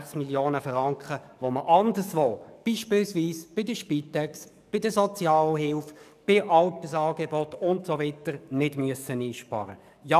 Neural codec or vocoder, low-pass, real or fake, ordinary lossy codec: codec, 44.1 kHz, 7.8 kbps, DAC; 14.4 kHz; fake; none